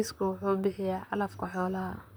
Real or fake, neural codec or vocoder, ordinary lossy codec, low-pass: fake; vocoder, 44.1 kHz, 128 mel bands, Pupu-Vocoder; none; none